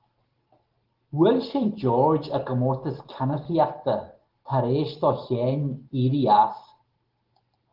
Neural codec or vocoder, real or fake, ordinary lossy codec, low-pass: none; real; Opus, 16 kbps; 5.4 kHz